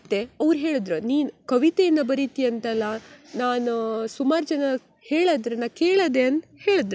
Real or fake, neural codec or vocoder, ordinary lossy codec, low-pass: real; none; none; none